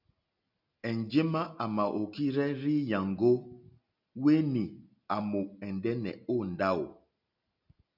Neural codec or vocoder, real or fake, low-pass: none; real; 5.4 kHz